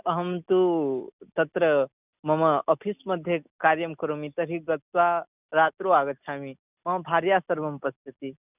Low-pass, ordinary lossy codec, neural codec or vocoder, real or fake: 3.6 kHz; none; none; real